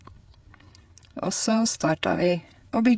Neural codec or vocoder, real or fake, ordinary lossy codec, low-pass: codec, 16 kHz, 4 kbps, FreqCodec, larger model; fake; none; none